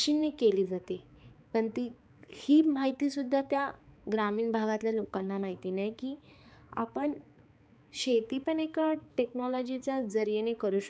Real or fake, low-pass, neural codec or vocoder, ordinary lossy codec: fake; none; codec, 16 kHz, 4 kbps, X-Codec, HuBERT features, trained on balanced general audio; none